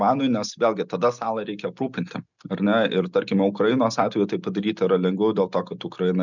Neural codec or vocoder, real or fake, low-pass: none; real; 7.2 kHz